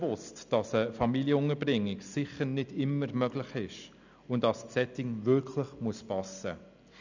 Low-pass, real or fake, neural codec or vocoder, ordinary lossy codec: 7.2 kHz; real; none; none